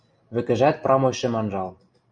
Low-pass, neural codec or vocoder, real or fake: 9.9 kHz; none; real